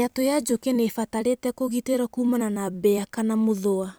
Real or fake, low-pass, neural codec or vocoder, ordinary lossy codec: fake; none; vocoder, 44.1 kHz, 128 mel bands every 256 samples, BigVGAN v2; none